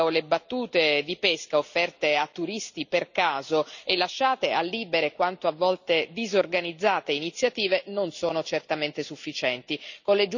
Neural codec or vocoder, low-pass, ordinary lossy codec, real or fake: none; 7.2 kHz; none; real